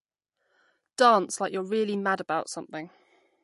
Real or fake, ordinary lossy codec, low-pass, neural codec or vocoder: real; MP3, 48 kbps; 14.4 kHz; none